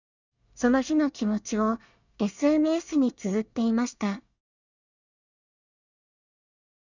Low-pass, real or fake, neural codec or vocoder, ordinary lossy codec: 7.2 kHz; fake; codec, 24 kHz, 1 kbps, SNAC; none